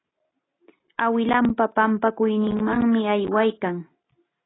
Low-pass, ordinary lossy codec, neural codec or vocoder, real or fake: 7.2 kHz; AAC, 16 kbps; none; real